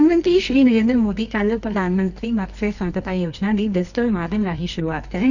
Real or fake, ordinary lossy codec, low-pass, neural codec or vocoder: fake; none; 7.2 kHz; codec, 24 kHz, 0.9 kbps, WavTokenizer, medium music audio release